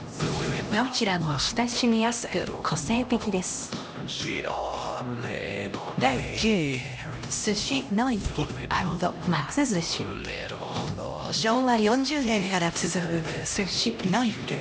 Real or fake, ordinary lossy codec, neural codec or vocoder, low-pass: fake; none; codec, 16 kHz, 1 kbps, X-Codec, HuBERT features, trained on LibriSpeech; none